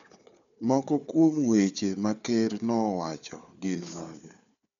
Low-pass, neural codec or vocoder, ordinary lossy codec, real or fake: 7.2 kHz; codec, 16 kHz, 4 kbps, FunCodec, trained on Chinese and English, 50 frames a second; none; fake